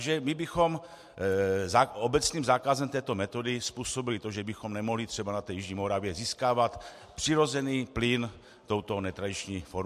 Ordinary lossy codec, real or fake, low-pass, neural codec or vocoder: MP3, 64 kbps; real; 14.4 kHz; none